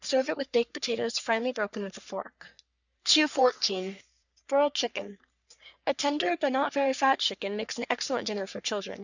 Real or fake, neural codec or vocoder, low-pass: fake; codec, 44.1 kHz, 3.4 kbps, Pupu-Codec; 7.2 kHz